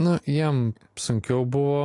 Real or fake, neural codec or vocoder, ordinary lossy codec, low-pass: real; none; AAC, 48 kbps; 10.8 kHz